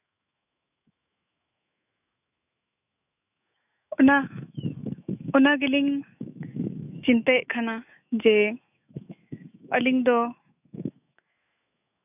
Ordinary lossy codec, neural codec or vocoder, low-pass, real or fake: none; autoencoder, 48 kHz, 128 numbers a frame, DAC-VAE, trained on Japanese speech; 3.6 kHz; fake